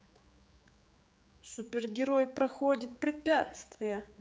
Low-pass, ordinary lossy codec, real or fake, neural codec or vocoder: none; none; fake; codec, 16 kHz, 4 kbps, X-Codec, HuBERT features, trained on general audio